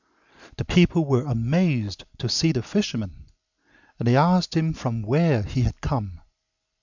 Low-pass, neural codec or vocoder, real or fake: 7.2 kHz; none; real